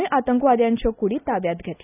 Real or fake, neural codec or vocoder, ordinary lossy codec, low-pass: real; none; none; 3.6 kHz